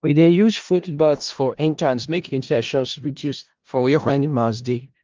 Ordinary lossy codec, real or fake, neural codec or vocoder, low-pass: Opus, 32 kbps; fake; codec, 16 kHz in and 24 kHz out, 0.4 kbps, LongCat-Audio-Codec, four codebook decoder; 7.2 kHz